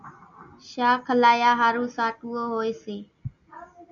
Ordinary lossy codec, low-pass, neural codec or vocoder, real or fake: MP3, 96 kbps; 7.2 kHz; none; real